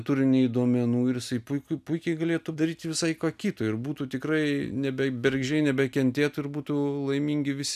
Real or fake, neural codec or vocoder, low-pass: real; none; 14.4 kHz